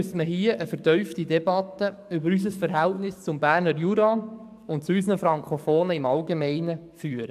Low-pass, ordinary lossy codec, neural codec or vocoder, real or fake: 14.4 kHz; AAC, 96 kbps; codec, 44.1 kHz, 7.8 kbps, DAC; fake